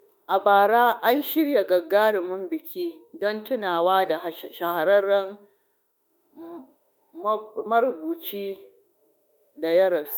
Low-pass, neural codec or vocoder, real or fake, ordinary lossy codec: none; autoencoder, 48 kHz, 32 numbers a frame, DAC-VAE, trained on Japanese speech; fake; none